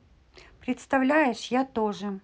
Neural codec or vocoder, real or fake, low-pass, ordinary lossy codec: none; real; none; none